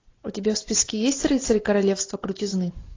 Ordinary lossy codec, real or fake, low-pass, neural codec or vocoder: AAC, 32 kbps; real; 7.2 kHz; none